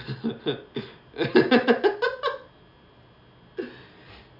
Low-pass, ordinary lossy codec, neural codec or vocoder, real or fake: 5.4 kHz; none; none; real